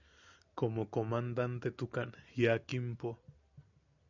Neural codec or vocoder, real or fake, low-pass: none; real; 7.2 kHz